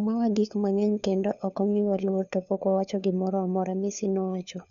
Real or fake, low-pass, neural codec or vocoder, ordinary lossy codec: fake; 7.2 kHz; codec, 16 kHz, 4 kbps, FunCodec, trained on LibriTTS, 50 frames a second; none